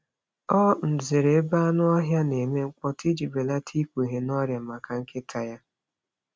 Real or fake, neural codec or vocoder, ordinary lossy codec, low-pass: real; none; none; none